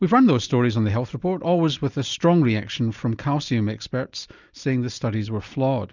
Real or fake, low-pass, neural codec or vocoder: real; 7.2 kHz; none